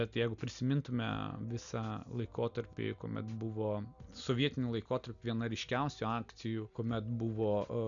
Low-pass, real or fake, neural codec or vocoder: 7.2 kHz; real; none